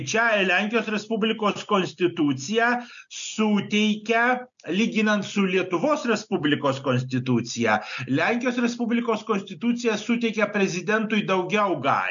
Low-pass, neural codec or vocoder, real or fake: 7.2 kHz; none; real